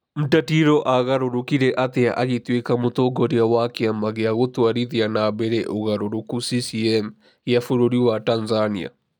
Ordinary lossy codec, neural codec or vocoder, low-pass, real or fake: none; none; 19.8 kHz; real